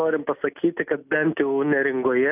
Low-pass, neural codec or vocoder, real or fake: 3.6 kHz; none; real